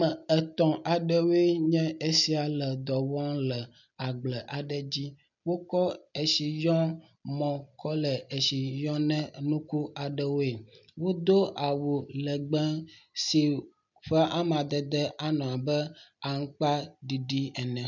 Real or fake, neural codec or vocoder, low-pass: real; none; 7.2 kHz